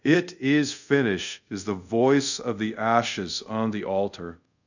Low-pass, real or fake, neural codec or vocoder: 7.2 kHz; fake; codec, 24 kHz, 0.5 kbps, DualCodec